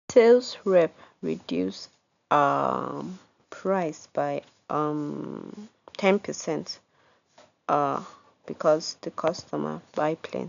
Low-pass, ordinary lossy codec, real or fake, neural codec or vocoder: 7.2 kHz; none; real; none